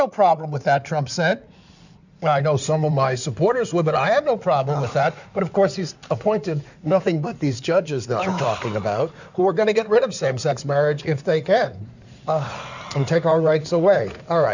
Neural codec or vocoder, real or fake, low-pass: codec, 16 kHz in and 24 kHz out, 2.2 kbps, FireRedTTS-2 codec; fake; 7.2 kHz